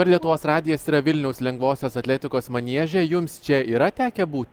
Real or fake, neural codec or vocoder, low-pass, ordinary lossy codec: real; none; 19.8 kHz; Opus, 24 kbps